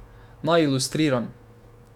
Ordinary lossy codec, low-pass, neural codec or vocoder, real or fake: none; 19.8 kHz; autoencoder, 48 kHz, 128 numbers a frame, DAC-VAE, trained on Japanese speech; fake